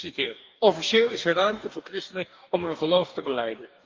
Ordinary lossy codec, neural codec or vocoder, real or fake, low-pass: Opus, 24 kbps; codec, 44.1 kHz, 2.6 kbps, DAC; fake; 7.2 kHz